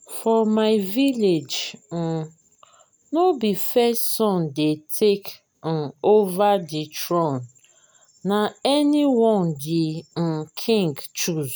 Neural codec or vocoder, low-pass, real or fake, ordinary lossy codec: none; none; real; none